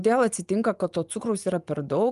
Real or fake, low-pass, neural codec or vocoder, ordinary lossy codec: fake; 10.8 kHz; vocoder, 24 kHz, 100 mel bands, Vocos; Opus, 32 kbps